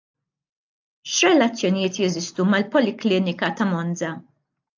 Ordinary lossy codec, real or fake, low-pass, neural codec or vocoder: AAC, 48 kbps; real; 7.2 kHz; none